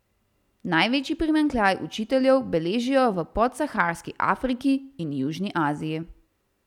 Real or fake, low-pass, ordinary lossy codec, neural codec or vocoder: real; 19.8 kHz; none; none